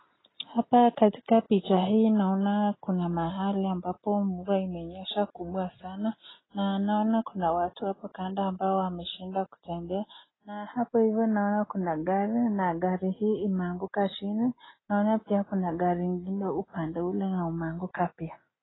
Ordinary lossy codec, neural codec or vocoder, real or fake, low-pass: AAC, 16 kbps; none; real; 7.2 kHz